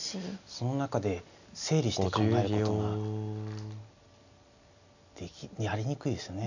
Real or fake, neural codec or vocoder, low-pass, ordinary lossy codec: real; none; 7.2 kHz; none